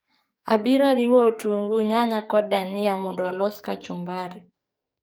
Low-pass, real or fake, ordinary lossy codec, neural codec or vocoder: none; fake; none; codec, 44.1 kHz, 2.6 kbps, SNAC